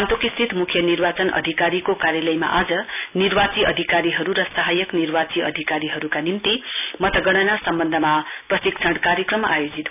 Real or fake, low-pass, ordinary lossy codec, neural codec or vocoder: real; 3.6 kHz; none; none